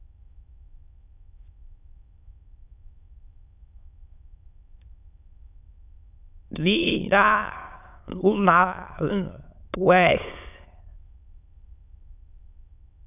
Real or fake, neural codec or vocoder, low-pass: fake; autoencoder, 22.05 kHz, a latent of 192 numbers a frame, VITS, trained on many speakers; 3.6 kHz